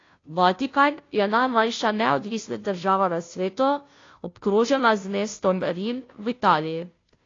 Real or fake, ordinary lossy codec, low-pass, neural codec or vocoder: fake; AAC, 32 kbps; 7.2 kHz; codec, 16 kHz, 0.5 kbps, FunCodec, trained on Chinese and English, 25 frames a second